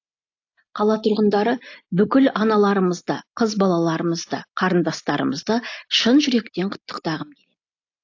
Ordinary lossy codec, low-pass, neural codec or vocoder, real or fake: AAC, 48 kbps; 7.2 kHz; none; real